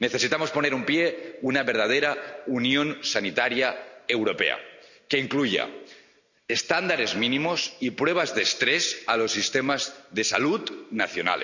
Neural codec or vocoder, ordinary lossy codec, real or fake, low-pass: none; none; real; 7.2 kHz